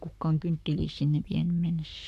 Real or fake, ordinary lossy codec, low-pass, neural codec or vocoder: fake; none; 14.4 kHz; codec, 44.1 kHz, 7.8 kbps, Pupu-Codec